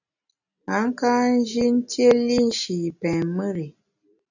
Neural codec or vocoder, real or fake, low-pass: none; real; 7.2 kHz